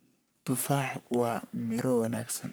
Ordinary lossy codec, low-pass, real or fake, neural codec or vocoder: none; none; fake; codec, 44.1 kHz, 3.4 kbps, Pupu-Codec